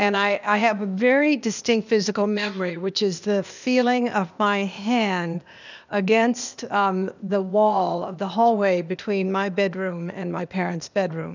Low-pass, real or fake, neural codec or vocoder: 7.2 kHz; fake; codec, 16 kHz, 0.8 kbps, ZipCodec